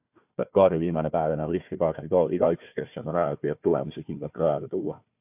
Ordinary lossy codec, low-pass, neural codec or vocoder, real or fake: AAC, 32 kbps; 3.6 kHz; codec, 16 kHz, 1 kbps, FunCodec, trained on Chinese and English, 50 frames a second; fake